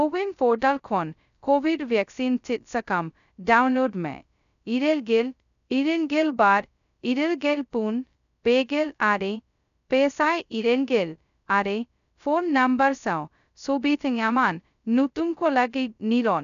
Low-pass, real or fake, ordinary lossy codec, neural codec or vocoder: 7.2 kHz; fake; none; codec, 16 kHz, 0.2 kbps, FocalCodec